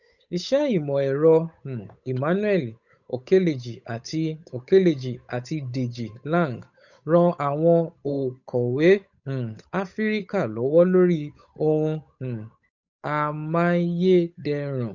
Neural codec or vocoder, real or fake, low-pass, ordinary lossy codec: codec, 16 kHz, 8 kbps, FunCodec, trained on Chinese and English, 25 frames a second; fake; 7.2 kHz; none